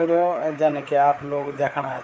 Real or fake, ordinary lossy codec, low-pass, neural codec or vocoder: fake; none; none; codec, 16 kHz, 4 kbps, FreqCodec, larger model